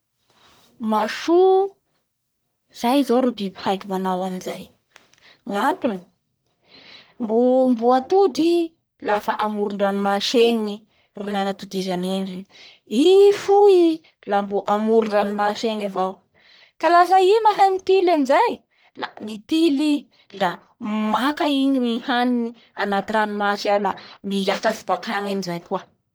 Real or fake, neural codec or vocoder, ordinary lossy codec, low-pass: fake; codec, 44.1 kHz, 1.7 kbps, Pupu-Codec; none; none